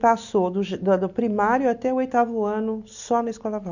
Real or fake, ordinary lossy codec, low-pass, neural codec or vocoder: real; none; 7.2 kHz; none